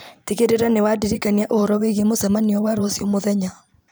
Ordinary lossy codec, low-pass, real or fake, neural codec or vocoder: none; none; fake; vocoder, 44.1 kHz, 128 mel bands every 512 samples, BigVGAN v2